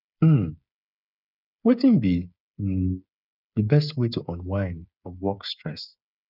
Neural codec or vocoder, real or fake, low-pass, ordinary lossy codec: codec, 16 kHz, 8 kbps, FreqCodec, smaller model; fake; 5.4 kHz; none